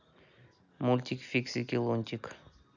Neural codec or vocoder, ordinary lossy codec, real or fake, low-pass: none; none; real; 7.2 kHz